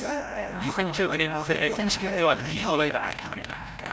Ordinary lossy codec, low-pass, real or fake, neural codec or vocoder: none; none; fake; codec, 16 kHz, 0.5 kbps, FreqCodec, larger model